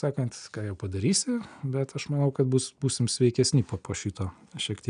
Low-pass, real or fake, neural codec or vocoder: 9.9 kHz; real; none